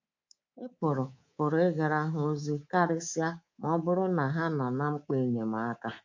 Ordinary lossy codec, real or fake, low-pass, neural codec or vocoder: MP3, 48 kbps; fake; 7.2 kHz; codec, 24 kHz, 3.1 kbps, DualCodec